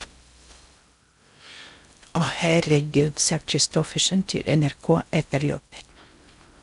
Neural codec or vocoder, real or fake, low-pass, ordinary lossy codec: codec, 16 kHz in and 24 kHz out, 0.6 kbps, FocalCodec, streaming, 4096 codes; fake; 10.8 kHz; none